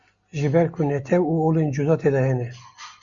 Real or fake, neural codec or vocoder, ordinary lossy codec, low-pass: real; none; Opus, 64 kbps; 7.2 kHz